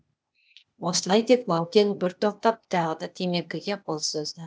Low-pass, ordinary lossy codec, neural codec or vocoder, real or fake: none; none; codec, 16 kHz, 0.8 kbps, ZipCodec; fake